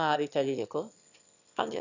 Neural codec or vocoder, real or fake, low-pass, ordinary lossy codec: autoencoder, 22.05 kHz, a latent of 192 numbers a frame, VITS, trained on one speaker; fake; 7.2 kHz; none